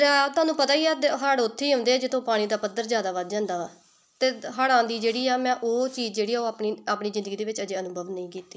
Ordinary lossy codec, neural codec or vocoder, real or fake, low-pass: none; none; real; none